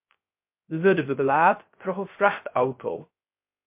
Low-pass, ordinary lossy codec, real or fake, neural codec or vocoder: 3.6 kHz; MP3, 32 kbps; fake; codec, 16 kHz, 0.3 kbps, FocalCodec